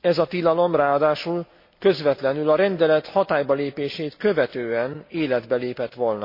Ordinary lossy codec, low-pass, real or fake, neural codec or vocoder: MP3, 32 kbps; 5.4 kHz; real; none